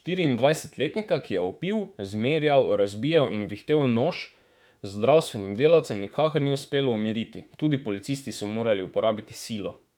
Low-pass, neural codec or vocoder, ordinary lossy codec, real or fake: 19.8 kHz; autoencoder, 48 kHz, 32 numbers a frame, DAC-VAE, trained on Japanese speech; none; fake